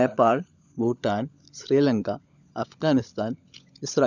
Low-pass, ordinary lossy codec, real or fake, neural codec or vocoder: 7.2 kHz; none; fake; codec, 16 kHz, 16 kbps, FunCodec, trained on LibriTTS, 50 frames a second